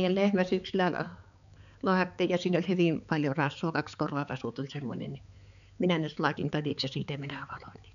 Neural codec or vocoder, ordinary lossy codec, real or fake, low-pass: codec, 16 kHz, 4 kbps, X-Codec, HuBERT features, trained on balanced general audio; none; fake; 7.2 kHz